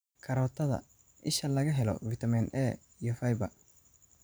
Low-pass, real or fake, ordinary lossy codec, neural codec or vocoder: none; fake; none; vocoder, 44.1 kHz, 128 mel bands every 512 samples, BigVGAN v2